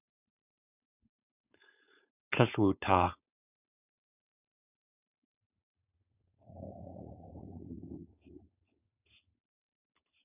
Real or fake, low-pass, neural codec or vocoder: fake; 3.6 kHz; codec, 16 kHz, 4.8 kbps, FACodec